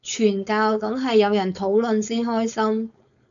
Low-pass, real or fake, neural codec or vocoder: 7.2 kHz; fake; codec, 16 kHz, 4.8 kbps, FACodec